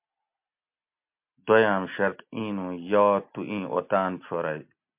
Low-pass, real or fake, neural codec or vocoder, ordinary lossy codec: 3.6 kHz; real; none; MP3, 32 kbps